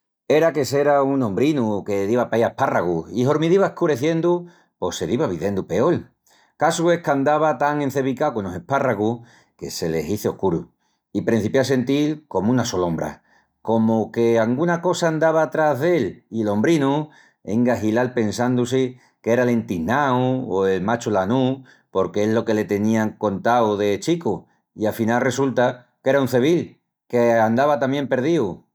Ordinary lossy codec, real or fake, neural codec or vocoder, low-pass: none; real; none; none